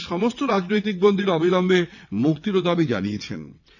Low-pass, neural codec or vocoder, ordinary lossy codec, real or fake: 7.2 kHz; vocoder, 22.05 kHz, 80 mel bands, WaveNeXt; none; fake